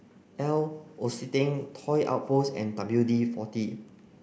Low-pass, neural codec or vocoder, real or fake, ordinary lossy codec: none; none; real; none